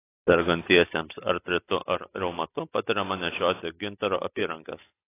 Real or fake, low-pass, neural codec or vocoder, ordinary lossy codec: real; 3.6 kHz; none; AAC, 24 kbps